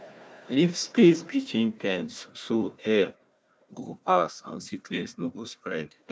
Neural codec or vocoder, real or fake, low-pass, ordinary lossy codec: codec, 16 kHz, 1 kbps, FunCodec, trained on Chinese and English, 50 frames a second; fake; none; none